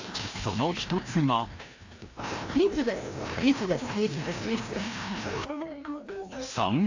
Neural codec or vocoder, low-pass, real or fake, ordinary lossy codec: codec, 16 kHz, 1 kbps, FreqCodec, larger model; 7.2 kHz; fake; AAC, 48 kbps